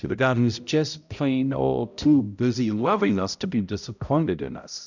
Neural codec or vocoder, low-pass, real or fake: codec, 16 kHz, 0.5 kbps, X-Codec, HuBERT features, trained on balanced general audio; 7.2 kHz; fake